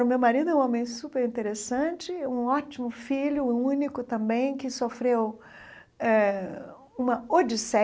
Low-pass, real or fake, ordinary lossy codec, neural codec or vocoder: none; real; none; none